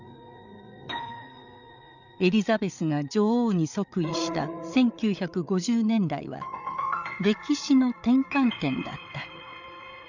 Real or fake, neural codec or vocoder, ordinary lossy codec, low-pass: fake; codec, 16 kHz, 8 kbps, FreqCodec, larger model; none; 7.2 kHz